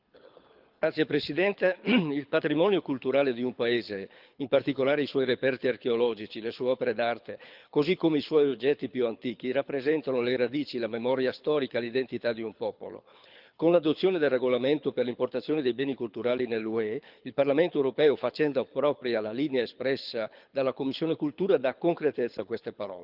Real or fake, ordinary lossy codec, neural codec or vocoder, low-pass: fake; Opus, 24 kbps; codec, 24 kHz, 6 kbps, HILCodec; 5.4 kHz